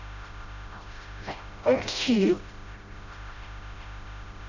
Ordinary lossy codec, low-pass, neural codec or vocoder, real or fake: none; 7.2 kHz; codec, 16 kHz, 0.5 kbps, FreqCodec, smaller model; fake